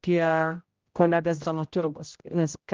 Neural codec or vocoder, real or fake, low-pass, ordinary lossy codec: codec, 16 kHz, 0.5 kbps, X-Codec, HuBERT features, trained on general audio; fake; 7.2 kHz; Opus, 24 kbps